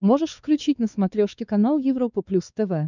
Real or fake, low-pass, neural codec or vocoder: fake; 7.2 kHz; codec, 16 kHz, 4 kbps, X-Codec, WavLM features, trained on Multilingual LibriSpeech